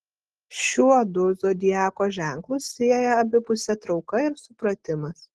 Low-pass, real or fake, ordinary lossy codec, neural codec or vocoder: 10.8 kHz; real; Opus, 16 kbps; none